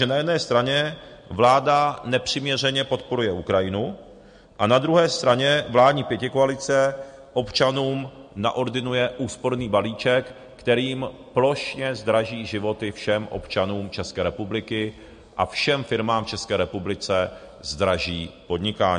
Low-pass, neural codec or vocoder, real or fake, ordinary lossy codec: 9.9 kHz; none; real; MP3, 48 kbps